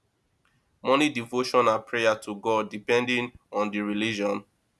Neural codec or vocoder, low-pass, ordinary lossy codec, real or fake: none; none; none; real